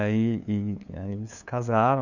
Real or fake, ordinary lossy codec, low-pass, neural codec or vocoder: fake; none; 7.2 kHz; codec, 16 kHz, 2 kbps, FunCodec, trained on LibriTTS, 25 frames a second